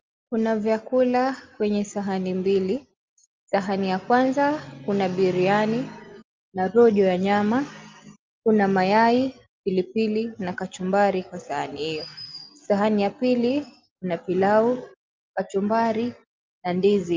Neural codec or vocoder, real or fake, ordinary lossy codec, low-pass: none; real; Opus, 32 kbps; 7.2 kHz